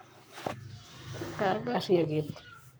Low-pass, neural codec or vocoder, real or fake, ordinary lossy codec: none; codec, 44.1 kHz, 7.8 kbps, Pupu-Codec; fake; none